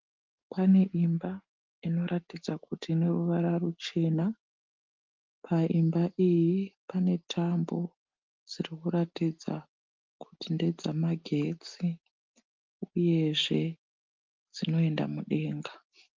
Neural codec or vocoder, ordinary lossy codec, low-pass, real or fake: none; Opus, 32 kbps; 7.2 kHz; real